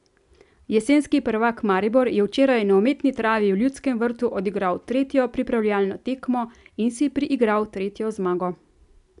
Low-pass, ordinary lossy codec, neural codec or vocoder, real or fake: 10.8 kHz; none; none; real